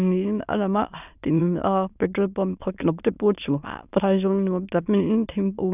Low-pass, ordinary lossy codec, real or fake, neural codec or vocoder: 3.6 kHz; none; fake; autoencoder, 22.05 kHz, a latent of 192 numbers a frame, VITS, trained on many speakers